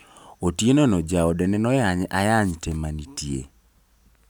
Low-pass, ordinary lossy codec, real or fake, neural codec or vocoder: none; none; real; none